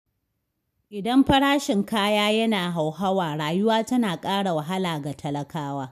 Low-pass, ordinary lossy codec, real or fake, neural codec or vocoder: 14.4 kHz; none; real; none